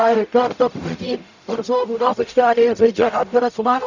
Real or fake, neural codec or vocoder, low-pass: fake; codec, 44.1 kHz, 0.9 kbps, DAC; 7.2 kHz